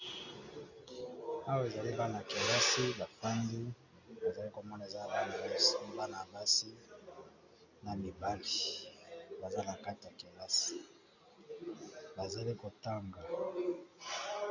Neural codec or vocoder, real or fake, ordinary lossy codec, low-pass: none; real; MP3, 64 kbps; 7.2 kHz